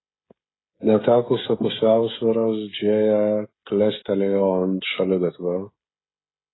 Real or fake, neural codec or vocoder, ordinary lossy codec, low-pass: fake; codec, 16 kHz, 16 kbps, FreqCodec, smaller model; AAC, 16 kbps; 7.2 kHz